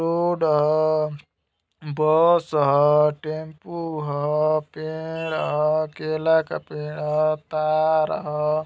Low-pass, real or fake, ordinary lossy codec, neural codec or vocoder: none; real; none; none